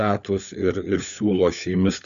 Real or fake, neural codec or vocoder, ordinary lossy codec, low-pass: fake; codec, 16 kHz, 4 kbps, FunCodec, trained on LibriTTS, 50 frames a second; MP3, 96 kbps; 7.2 kHz